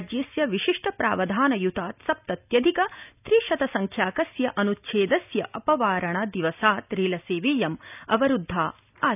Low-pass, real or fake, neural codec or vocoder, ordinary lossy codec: 3.6 kHz; real; none; none